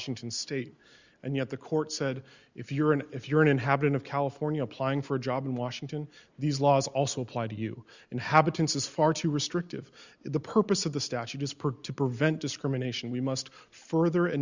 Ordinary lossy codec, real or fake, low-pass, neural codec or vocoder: Opus, 64 kbps; real; 7.2 kHz; none